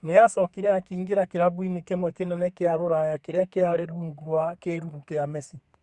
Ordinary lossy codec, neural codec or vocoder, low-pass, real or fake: Opus, 64 kbps; codec, 32 kHz, 1.9 kbps, SNAC; 10.8 kHz; fake